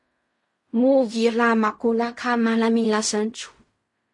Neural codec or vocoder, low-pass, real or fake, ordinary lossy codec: codec, 16 kHz in and 24 kHz out, 0.4 kbps, LongCat-Audio-Codec, fine tuned four codebook decoder; 10.8 kHz; fake; MP3, 48 kbps